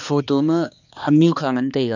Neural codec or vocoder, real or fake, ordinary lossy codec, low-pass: codec, 16 kHz, 2 kbps, X-Codec, HuBERT features, trained on balanced general audio; fake; none; 7.2 kHz